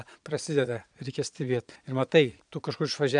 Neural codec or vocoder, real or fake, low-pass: vocoder, 22.05 kHz, 80 mel bands, Vocos; fake; 9.9 kHz